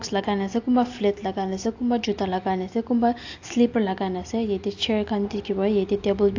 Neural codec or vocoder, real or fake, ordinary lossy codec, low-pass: none; real; AAC, 48 kbps; 7.2 kHz